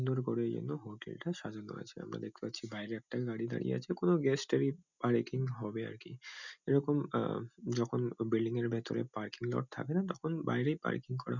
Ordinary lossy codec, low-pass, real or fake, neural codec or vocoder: none; 7.2 kHz; real; none